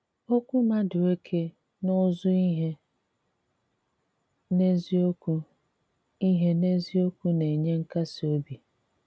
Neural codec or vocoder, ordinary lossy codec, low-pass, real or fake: none; none; none; real